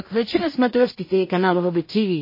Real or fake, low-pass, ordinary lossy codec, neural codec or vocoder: fake; 5.4 kHz; MP3, 24 kbps; codec, 16 kHz in and 24 kHz out, 0.4 kbps, LongCat-Audio-Codec, two codebook decoder